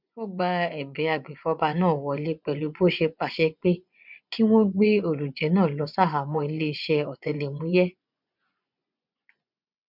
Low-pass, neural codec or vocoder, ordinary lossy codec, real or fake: 5.4 kHz; vocoder, 24 kHz, 100 mel bands, Vocos; none; fake